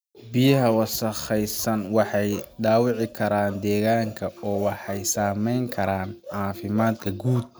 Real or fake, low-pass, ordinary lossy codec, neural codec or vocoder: real; none; none; none